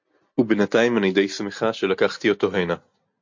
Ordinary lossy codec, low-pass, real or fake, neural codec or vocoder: MP3, 48 kbps; 7.2 kHz; real; none